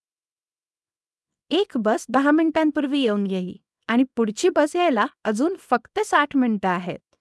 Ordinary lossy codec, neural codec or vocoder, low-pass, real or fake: none; codec, 24 kHz, 0.9 kbps, WavTokenizer, small release; none; fake